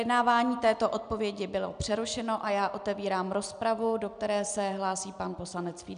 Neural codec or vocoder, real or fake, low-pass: none; real; 9.9 kHz